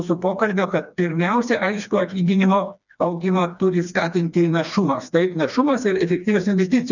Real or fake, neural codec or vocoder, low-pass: fake; codec, 16 kHz, 2 kbps, FreqCodec, smaller model; 7.2 kHz